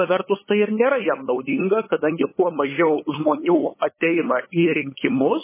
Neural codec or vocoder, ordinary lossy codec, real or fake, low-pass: codec, 16 kHz, 8 kbps, FunCodec, trained on LibriTTS, 25 frames a second; MP3, 16 kbps; fake; 3.6 kHz